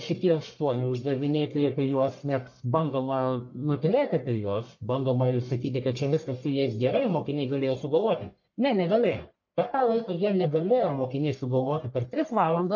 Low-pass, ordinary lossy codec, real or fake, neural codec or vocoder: 7.2 kHz; MP3, 48 kbps; fake; codec, 44.1 kHz, 1.7 kbps, Pupu-Codec